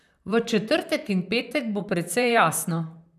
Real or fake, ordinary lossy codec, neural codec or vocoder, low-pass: fake; none; vocoder, 44.1 kHz, 128 mel bands, Pupu-Vocoder; 14.4 kHz